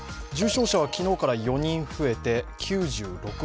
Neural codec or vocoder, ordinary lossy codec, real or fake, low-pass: none; none; real; none